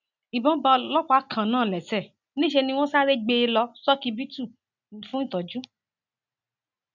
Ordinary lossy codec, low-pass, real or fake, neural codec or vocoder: none; 7.2 kHz; real; none